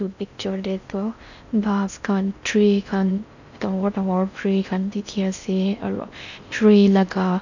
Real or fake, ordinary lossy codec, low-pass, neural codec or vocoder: fake; none; 7.2 kHz; codec, 16 kHz in and 24 kHz out, 0.6 kbps, FocalCodec, streaming, 4096 codes